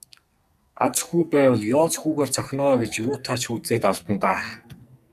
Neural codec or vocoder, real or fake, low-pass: codec, 44.1 kHz, 2.6 kbps, SNAC; fake; 14.4 kHz